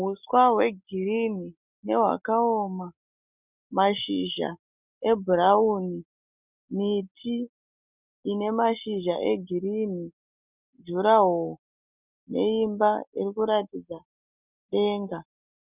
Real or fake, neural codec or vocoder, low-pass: real; none; 3.6 kHz